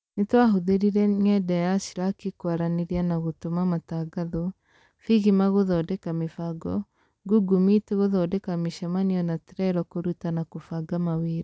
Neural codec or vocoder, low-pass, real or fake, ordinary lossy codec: none; none; real; none